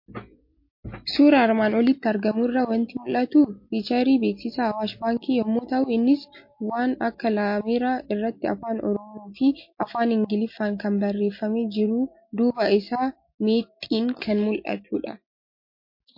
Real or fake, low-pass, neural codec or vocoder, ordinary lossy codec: real; 5.4 kHz; none; MP3, 32 kbps